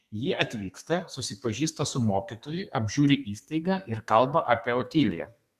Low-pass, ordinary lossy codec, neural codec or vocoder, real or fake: 14.4 kHz; Opus, 64 kbps; codec, 32 kHz, 1.9 kbps, SNAC; fake